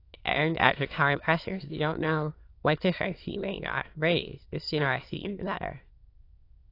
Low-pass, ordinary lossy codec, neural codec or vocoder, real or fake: 5.4 kHz; AAC, 32 kbps; autoencoder, 22.05 kHz, a latent of 192 numbers a frame, VITS, trained on many speakers; fake